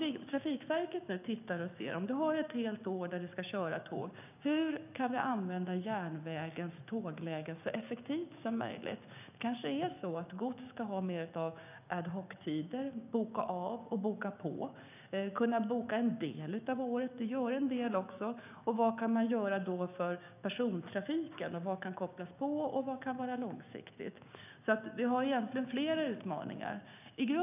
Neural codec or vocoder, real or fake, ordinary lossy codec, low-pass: codec, 16 kHz, 6 kbps, DAC; fake; none; 3.6 kHz